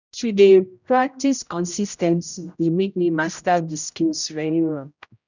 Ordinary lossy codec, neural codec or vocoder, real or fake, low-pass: none; codec, 16 kHz, 0.5 kbps, X-Codec, HuBERT features, trained on general audio; fake; 7.2 kHz